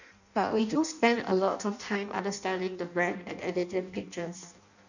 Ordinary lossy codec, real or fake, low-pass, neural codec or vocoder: none; fake; 7.2 kHz; codec, 16 kHz in and 24 kHz out, 0.6 kbps, FireRedTTS-2 codec